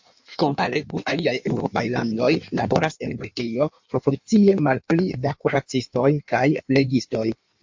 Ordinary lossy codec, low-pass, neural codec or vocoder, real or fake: MP3, 48 kbps; 7.2 kHz; codec, 16 kHz in and 24 kHz out, 1.1 kbps, FireRedTTS-2 codec; fake